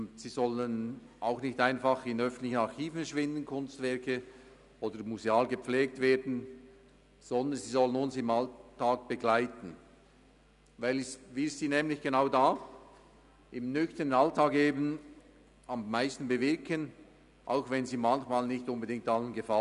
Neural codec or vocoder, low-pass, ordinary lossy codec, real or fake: none; 10.8 kHz; none; real